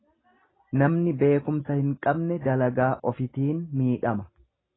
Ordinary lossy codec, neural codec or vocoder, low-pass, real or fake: AAC, 16 kbps; none; 7.2 kHz; real